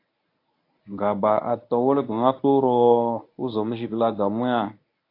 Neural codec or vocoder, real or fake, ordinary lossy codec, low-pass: codec, 24 kHz, 0.9 kbps, WavTokenizer, medium speech release version 1; fake; AAC, 32 kbps; 5.4 kHz